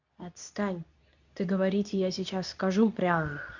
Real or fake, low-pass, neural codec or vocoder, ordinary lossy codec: fake; 7.2 kHz; codec, 24 kHz, 0.9 kbps, WavTokenizer, medium speech release version 2; none